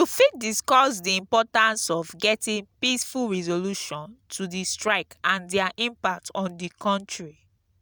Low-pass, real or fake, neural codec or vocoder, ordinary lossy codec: none; real; none; none